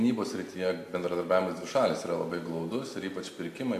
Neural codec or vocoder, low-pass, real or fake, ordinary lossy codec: none; 14.4 kHz; real; AAC, 64 kbps